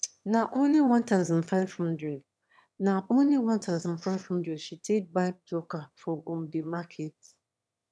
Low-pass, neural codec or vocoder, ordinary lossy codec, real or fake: none; autoencoder, 22.05 kHz, a latent of 192 numbers a frame, VITS, trained on one speaker; none; fake